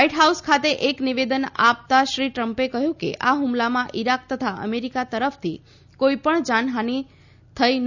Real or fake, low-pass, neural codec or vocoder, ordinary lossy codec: real; 7.2 kHz; none; none